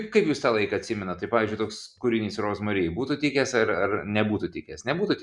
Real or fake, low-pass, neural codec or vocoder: real; 10.8 kHz; none